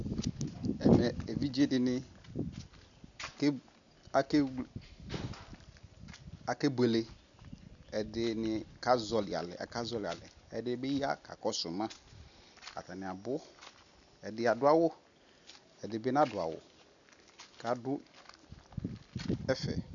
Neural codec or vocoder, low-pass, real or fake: none; 7.2 kHz; real